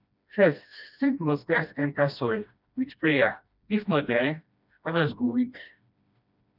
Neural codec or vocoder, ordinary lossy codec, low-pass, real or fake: codec, 16 kHz, 1 kbps, FreqCodec, smaller model; none; 5.4 kHz; fake